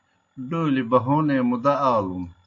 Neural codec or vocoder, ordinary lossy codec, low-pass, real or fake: none; AAC, 48 kbps; 7.2 kHz; real